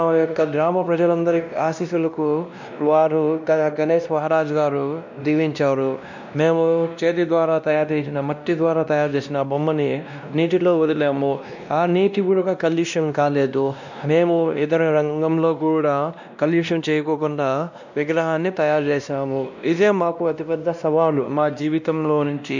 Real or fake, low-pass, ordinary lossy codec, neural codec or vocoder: fake; 7.2 kHz; none; codec, 16 kHz, 1 kbps, X-Codec, WavLM features, trained on Multilingual LibriSpeech